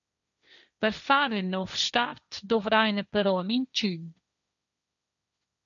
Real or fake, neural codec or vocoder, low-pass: fake; codec, 16 kHz, 1.1 kbps, Voila-Tokenizer; 7.2 kHz